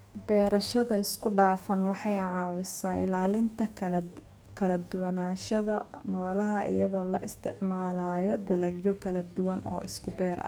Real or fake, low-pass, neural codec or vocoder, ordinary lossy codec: fake; none; codec, 44.1 kHz, 2.6 kbps, DAC; none